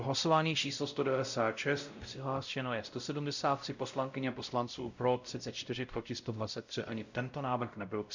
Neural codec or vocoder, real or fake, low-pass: codec, 16 kHz, 0.5 kbps, X-Codec, WavLM features, trained on Multilingual LibriSpeech; fake; 7.2 kHz